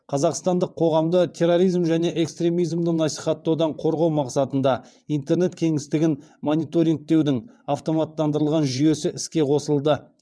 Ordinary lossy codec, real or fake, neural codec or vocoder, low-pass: none; fake; vocoder, 22.05 kHz, 80 mel bands, WaveNeXt; none